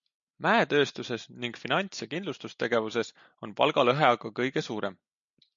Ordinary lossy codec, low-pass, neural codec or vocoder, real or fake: MP3, 64 kbps; 7.2 kHz; none; real